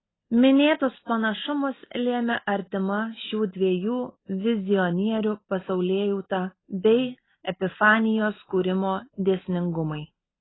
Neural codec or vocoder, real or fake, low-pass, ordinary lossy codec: none; real; 7.2 kHz; AAC, 16 kbps